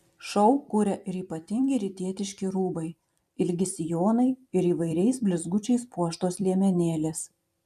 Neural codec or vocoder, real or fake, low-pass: vocoder, 44.1 kHz, 128 mel bands every 256 samples, BigVGAN v2; fake; 14.4 kHz